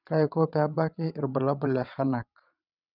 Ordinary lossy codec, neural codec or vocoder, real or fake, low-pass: none; vocoder, 22.05 kHz, 80 mel bands, WaveNeXt; fake; 5.4 kHz